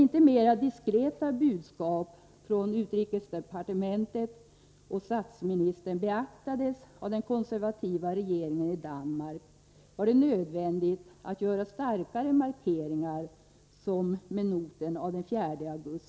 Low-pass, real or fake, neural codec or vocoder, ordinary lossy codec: none; real; none; none